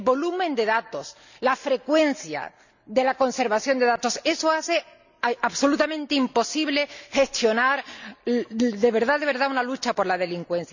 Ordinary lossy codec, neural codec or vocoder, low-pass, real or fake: none; none; 7.2 kHz; real